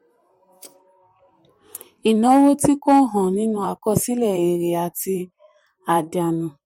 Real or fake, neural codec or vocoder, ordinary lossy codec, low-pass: fake; vocoder, 44.1 kHz, 128 mel bands, Pupu-Vocoder; MP3, 64 kbps; 19.8 kHz